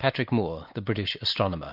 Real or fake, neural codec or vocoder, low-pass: real; none; 5.4 kHz